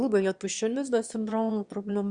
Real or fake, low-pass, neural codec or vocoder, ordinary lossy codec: fake; 9.9 kHz; autoencoder, 22.05 kHz, a latent of 192 numbers a frame, VITS, trained on one speaker; Opus, 64 kbps